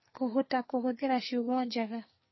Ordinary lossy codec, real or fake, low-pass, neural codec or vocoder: MP3, 24 kbps; fake; 7.2 kHz; codec, 44.1 kHz, 2.6 kbps, SNAC